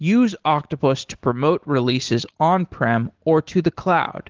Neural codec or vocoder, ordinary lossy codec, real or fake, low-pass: none; Opus, 16 kbps; real; 7.2 kHz